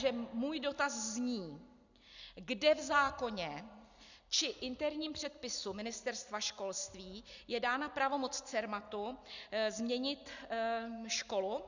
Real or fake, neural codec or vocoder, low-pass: real; none; 7.2 kHz